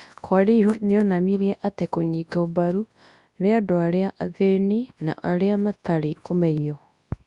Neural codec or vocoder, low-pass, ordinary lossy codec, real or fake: codec, 24 kHz, 0.9 kbps, WavTokenizer, large speech release; 10.8 kHz; Opus, 64 kbps; fake